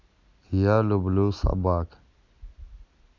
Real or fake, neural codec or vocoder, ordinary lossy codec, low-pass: real; none; none; 7.2 kHz